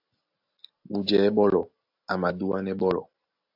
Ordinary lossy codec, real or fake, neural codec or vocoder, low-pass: AAC, 48 kbps; real; none; 5.4 kHz